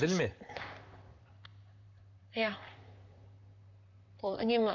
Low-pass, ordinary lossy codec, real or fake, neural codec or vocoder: 7.2 kHz; none; fake; vocoder, 44.1 kHz, 128 mel bands, Pupu-Vocoder